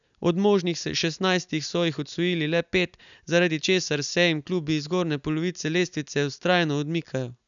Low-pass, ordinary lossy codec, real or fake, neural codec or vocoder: 7.2 kHz; none; real; none